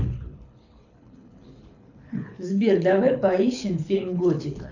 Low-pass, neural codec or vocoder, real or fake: 7.2 kHz; codec, 24 kHz, 6 kbps, HILCodec; fake